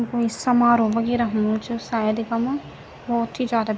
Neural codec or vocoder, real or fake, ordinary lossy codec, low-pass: none; real; none; none